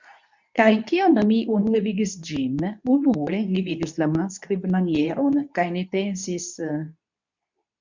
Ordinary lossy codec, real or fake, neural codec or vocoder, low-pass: MP3, 64 kbps; fake; codec, 24 kHz, 0.9 kbps, WavTokenizer, medium speech release version 2; 7.2 kHz